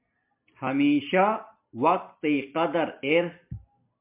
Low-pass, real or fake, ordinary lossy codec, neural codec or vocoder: 3.6 kHz; real; MP3, 32 kbps; none